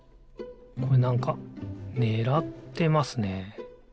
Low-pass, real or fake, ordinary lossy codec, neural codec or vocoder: none; real; none; none